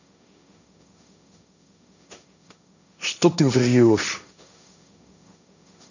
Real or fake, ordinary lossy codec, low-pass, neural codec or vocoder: fake; none; 7.2 kHz; codec, 16 kHz, 1.1 kbps, Voila-Tokenizer